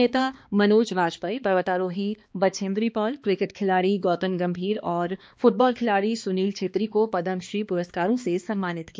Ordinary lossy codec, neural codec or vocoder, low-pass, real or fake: none; codec, 16 kHz, 2 kbps, X-Codec, HuBERT features, trained on balanced general audio; none; fake